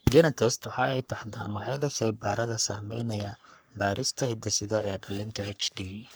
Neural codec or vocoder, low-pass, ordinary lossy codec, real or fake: codec, 44.1 kHz, 3.4 kbps, Pupu-Codec; none; none; fake